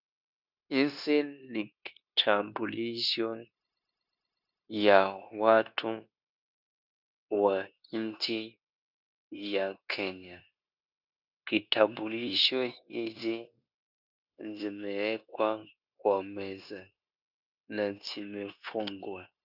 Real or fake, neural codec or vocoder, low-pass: fake; codec, 16 kHz, 0.9 kbps, LongCat-Audio-Codec; 5.4 kHz